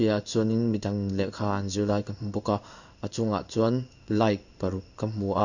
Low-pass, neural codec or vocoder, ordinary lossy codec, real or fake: 7.2 kHz; codec, 16 kHz in and 24 kHz out, 1 kbps, XY-Tokenizer; none; fake